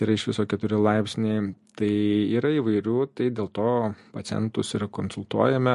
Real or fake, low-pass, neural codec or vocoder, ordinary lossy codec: real; 14.4 kHz; none; MP3, 48 kbps